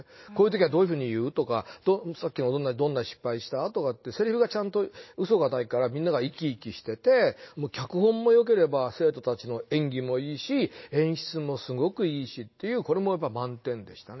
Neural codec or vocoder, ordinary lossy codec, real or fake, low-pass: none; MP3, 24 kbps; real; 7.2 kHz